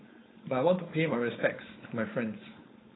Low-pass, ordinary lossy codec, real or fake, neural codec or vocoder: 7.2 kHz; AAC, 16 kbps; fake; codec, 16 kHz, 4.8 kbps, FACodec